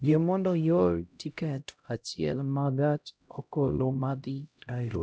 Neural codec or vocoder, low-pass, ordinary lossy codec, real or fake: codec, 16 kHz, 0.5 kbps, X-Codec, HuBERT features, trained on LibriSpeech; none; none; fake